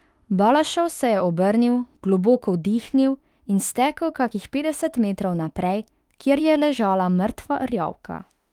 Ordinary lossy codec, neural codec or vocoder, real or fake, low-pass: Opus, 32 kbps; autoencoder, 48 kHz, 32 numbers a frame, DAC-VAE, trained on Japanese speech; fake; 19.8 kHz